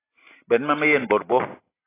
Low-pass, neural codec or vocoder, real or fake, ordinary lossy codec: 3.6 kHz; none; real; AAC, 16 kbps